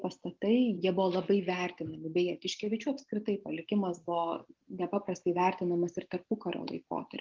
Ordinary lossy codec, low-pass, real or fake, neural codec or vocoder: Opus, 32 kbps; 7.2 kHz; real; none